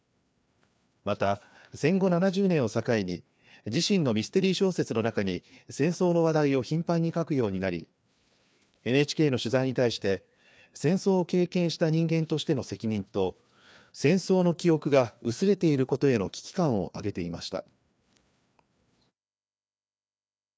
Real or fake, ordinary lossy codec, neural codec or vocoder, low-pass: fake; none; codec, 16 kHz, 2 kbps, FreqCodec, larger model; none